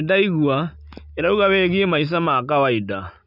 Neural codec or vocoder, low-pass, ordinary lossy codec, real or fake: none; 5.4 kHz; none; real